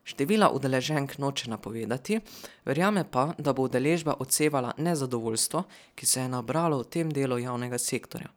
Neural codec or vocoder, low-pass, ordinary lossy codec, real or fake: none; none; none; real